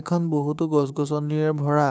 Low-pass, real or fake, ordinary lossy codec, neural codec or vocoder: none; fake; none; codec, 16 kHz, 6 kbps, DAC